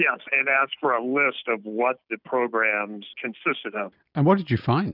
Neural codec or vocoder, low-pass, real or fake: none; 5.4 kHz; real